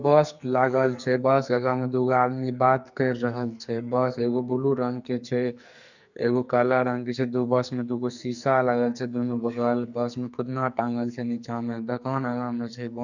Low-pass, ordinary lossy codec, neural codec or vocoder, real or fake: 7.2 kHz; none; codec, 44.1 kHz, 2.6 kbps, SNAC; fake